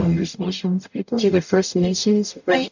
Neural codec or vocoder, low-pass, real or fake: codec, 44.1 kHz, 0.9 kbps, DAC; 7.2 kHz; fake